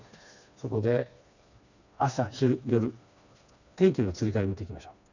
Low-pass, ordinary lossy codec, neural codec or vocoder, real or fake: 7.2 kHz; none; codec, 16 kHz, 2 kbps, FreqCodec, smaller model; fake